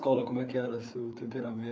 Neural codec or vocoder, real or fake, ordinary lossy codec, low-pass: codec, 16 kHz, 4 kbps, FreqCodec, larger model; fake; none; none